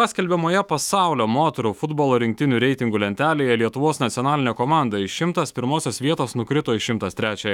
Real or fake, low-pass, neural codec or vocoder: fake; 19.8 kHz; autoencoder, 48 kHz, 128 numbers a frame, DAC-VAE, trained on Japanese speech